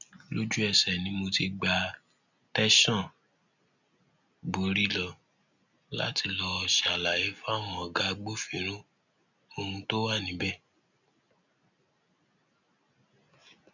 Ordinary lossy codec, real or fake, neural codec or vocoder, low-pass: none; real; none; 7.2 kHz